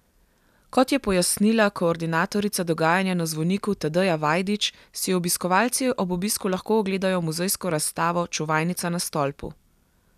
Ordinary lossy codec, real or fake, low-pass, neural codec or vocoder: none; real; 14.4 kHz; none